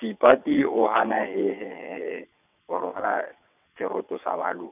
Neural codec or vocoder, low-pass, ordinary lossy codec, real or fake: vocoder, 22.05 kHz, 80 mel bands, WaveNeXt; 3.6 kHz; none; fake